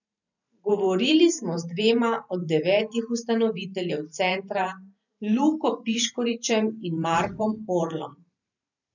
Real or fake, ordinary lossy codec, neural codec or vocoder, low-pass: fake; none; vocoder, 44.1 kHz, 128 mel bands every 512 samples, BigVGAN v2; 7.2 kHz